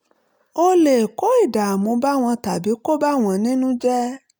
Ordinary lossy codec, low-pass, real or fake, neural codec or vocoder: none; none; real; none